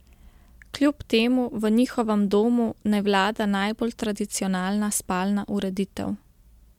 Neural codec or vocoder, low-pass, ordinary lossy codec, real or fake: none; 19.8 kHz; MP3, 96 kbps; real